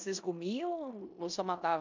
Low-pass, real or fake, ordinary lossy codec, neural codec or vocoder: 7.2 kHz; fake; MP3, 64 kbps; codec, 16 kHz in and 24 kHz out, 0.9 kbps, LongCat-Audio-Codec, four codebook decoder